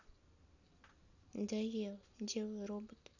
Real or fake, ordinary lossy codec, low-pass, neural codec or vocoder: real; none; 7.2 kHz; none